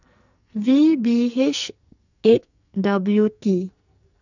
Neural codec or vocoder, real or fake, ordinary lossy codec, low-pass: codec, 44.1 kHz, 2.6 kbps, SNAC; fake; none; 7.2 kHz